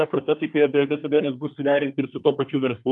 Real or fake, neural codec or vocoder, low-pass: fake; codec, 24 kHz, 1 kbps, SNAC; 10.8 kHz